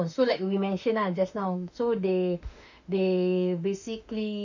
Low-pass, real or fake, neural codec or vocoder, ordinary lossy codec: 7.2 kHz; fake; autoencoder, 48 kHz, 32 numbers a frame, DAC-VAE, trained on Japanese speech; none